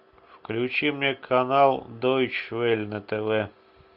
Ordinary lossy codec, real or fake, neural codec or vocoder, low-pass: Opus, 64 kbps; real; none; 5.4 kHz